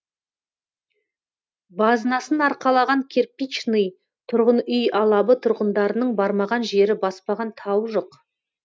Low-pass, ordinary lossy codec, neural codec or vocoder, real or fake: none; none; none; real